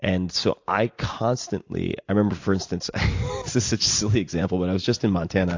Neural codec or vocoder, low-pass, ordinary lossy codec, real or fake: none; 7.2 kHz; AAC, 48 kbps; real